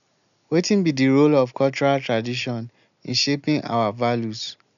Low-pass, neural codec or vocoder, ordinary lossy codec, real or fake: 7.2 kHz; none; none; real